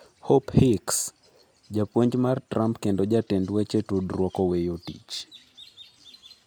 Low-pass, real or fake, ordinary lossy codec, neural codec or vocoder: none; real; none; none